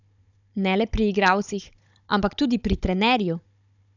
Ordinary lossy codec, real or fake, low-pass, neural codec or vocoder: none; fake; 7.2 kHz; codec, 16 kHz, 16 kbps, FunCodec, trained on Chinese and English, 50 frames a second